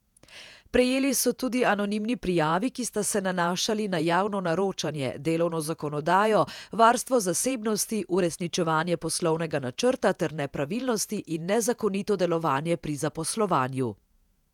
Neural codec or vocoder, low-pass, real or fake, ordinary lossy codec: vocoder, 48 kHz, 128 mel bands, Vocos; 19.8 kHz; fake; none